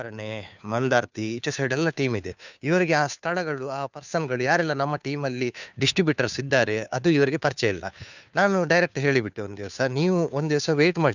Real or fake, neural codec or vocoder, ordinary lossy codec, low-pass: fake; codec, 16 kHz, 2 kbps, FunCodec, trained on Chinese and English, 25 frames a second; none; 7.2 kHz